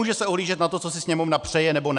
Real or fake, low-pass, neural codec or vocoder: real; 10.8 kHz; none